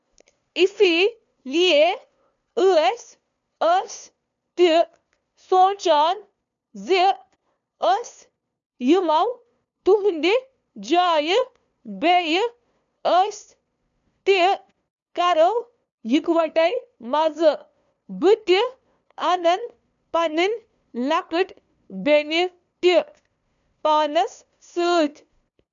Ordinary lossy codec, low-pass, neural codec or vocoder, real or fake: none; 7.2 kHz; codec, 16 kHz, 2 kbps, FunCodec, trained on LibriTTS, 25 frames a second; fake